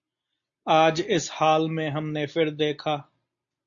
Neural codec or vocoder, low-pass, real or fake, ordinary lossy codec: none; 7.2 kHz; real; AAC, 64 kbps